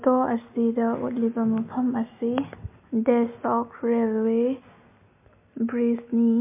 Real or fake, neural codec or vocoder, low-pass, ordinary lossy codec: real; none; 3.6 kHz; MP3, 24 kbps